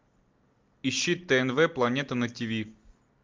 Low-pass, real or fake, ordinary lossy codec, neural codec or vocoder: 7.2 kHz; real; Opus, 32 kbps; none